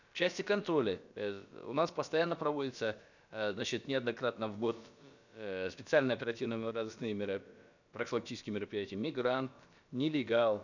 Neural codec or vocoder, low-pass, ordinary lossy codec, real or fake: codec, 16 kHz, about 1 kbps, DyCAST, with the encoder's durations; 7.2 kHz; none; fake